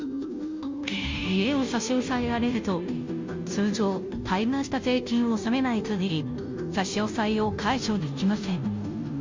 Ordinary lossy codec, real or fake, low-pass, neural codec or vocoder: MP3, 48 kbps; fake; 7.2 kHz; codec, 16 kHz, 0.5 kbps, FunCodec, trained on Chinese and English, 25 frames a second